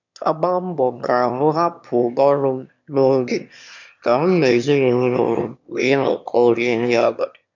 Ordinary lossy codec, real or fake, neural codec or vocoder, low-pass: none; fake; autoencoder, 22.05 kHz, a latent of 192 numbers a frame, VITS, trained on one speaker; 7.2 kHz